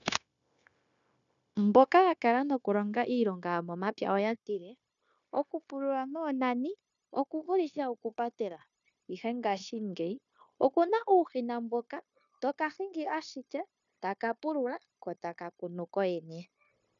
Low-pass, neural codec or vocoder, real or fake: 7.2 kHz; codec, 16 kHz, 0.9 kbps, LongCat-Audio-Codec; fake